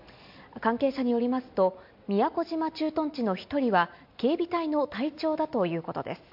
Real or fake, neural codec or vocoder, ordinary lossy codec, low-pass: real; none; MP3, 48 kbps; 5.4 kHz